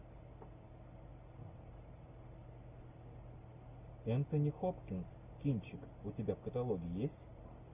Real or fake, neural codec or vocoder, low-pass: real; none; 3.6 kHz